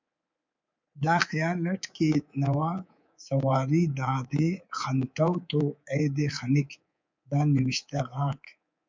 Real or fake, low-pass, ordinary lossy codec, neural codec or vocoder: fake; 7.2 kHz; MP3, 64 kbps; codec, 24 kHz, 3.1 kbps, DualCodec